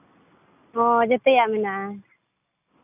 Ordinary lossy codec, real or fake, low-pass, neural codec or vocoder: none; real; 3.6 kHz; none